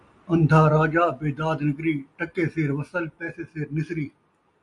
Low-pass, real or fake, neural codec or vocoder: 10.8 kHz; real; none